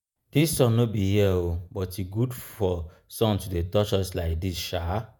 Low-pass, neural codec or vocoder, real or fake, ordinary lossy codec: none; vocoder, 48 kHz, 128 mel bands, Vocos; fake; none